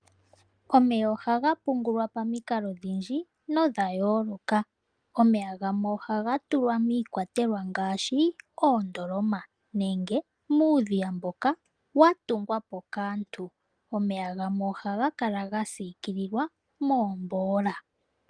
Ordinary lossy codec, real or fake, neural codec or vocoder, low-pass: Opus, 32 kbps; real; none; 9.9 kHz